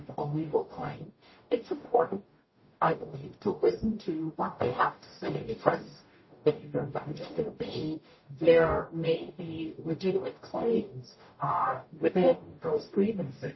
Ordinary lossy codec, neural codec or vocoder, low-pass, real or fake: MP3, 24 kbps; codec, 44.1 kHz, 0.9 kbps, DAC; 7.2 kHz; fake